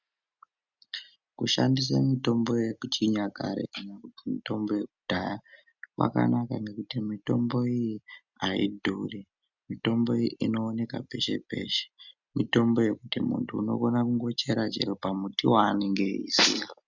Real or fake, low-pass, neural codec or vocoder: real; 7.2 kHz; none